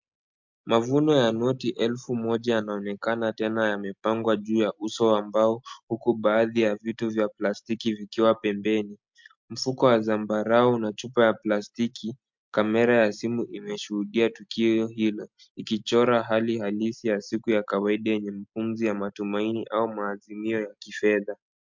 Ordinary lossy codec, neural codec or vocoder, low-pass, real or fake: MP3, 64 kbps; none; 7.2 kHz; real